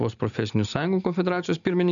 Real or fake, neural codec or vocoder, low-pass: real; none; 7.2 kHz